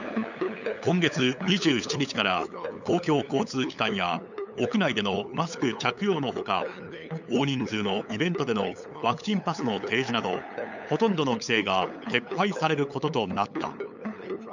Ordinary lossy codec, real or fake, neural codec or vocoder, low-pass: none; fake; codec, 16 kHz, 8 kbps, FunCodec, trained on LibriTTS, 25 frames a second; 7.2 kHz